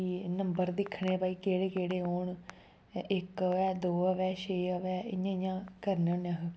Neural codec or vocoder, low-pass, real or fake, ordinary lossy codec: none; none; real; none